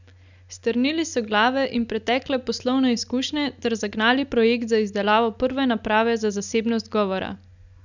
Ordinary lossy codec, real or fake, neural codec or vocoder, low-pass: none; real; none; 7.2 kHz